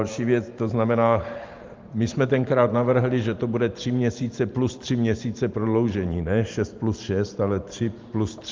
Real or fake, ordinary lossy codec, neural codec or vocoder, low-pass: fake; Opus, 32 kbps; vocoder, 44.1 kHz, 128 mel bands every 512 samples, BigVGAN v2; 7.2 kHz